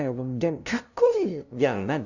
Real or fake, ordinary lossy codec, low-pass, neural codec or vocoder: fake; none; 7.2 kHz; codec, 16 kHz, 0.5 kbps, FunCodec, trained on LibriTTS, 25 frames a second